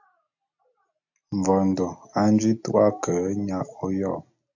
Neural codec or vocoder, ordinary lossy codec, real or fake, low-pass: none; AAC, 48 kbps; real; 7.2 kHz